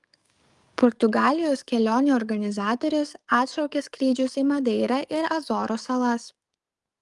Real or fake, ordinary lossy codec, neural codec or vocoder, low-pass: fake; Opus, 32 kbps; codec, 44.1 kHz, 7.8 kbps, DAC; 10.8 kHz